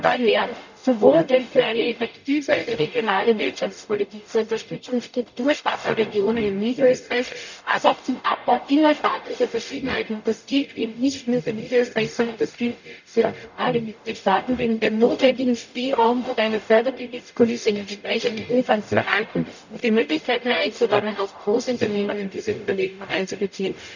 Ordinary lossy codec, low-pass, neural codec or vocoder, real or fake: none; 7.2 kHz; codec, 44.1 kHz, 0.9 kbps, DAC; fake